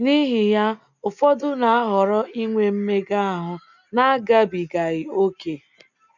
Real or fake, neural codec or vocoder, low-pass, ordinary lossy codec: real; none; 7.2 kHz; none